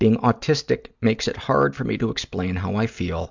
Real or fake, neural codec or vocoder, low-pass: real; none; 7.2 kHz